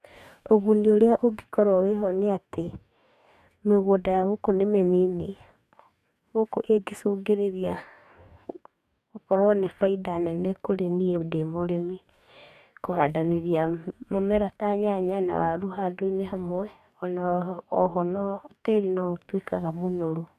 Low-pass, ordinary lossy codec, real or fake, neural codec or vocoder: 14.4 kHz; none; fake; codec, 44.1 kHz, 2.6 kbps, DAC